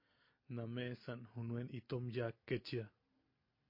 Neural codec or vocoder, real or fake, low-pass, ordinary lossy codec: none; real; 5.4 kHz; MP3, 32 kbps